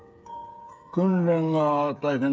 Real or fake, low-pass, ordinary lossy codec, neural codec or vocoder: fake; none; none; codec, 16 kHz, 8 kbps, FreqCodec, smaller model